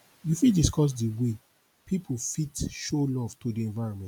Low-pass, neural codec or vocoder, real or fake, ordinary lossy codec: 19.8 kHz; none; real; none